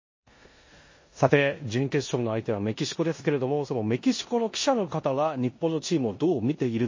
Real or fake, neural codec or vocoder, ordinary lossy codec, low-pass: fake; codec, 16 kHz in and 24 kHz out, 0.9 kbps, LongCat-Audio-Codec, four codebook decoder; MP3, 32 kbps; 7.2 kHz